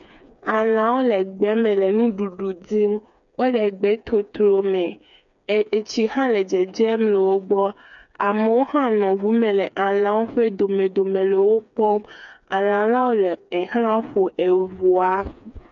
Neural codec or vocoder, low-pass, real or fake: codec, 16 kHz, 4 kbps, FreqCodec, smaller model; 7.2 kHz; fake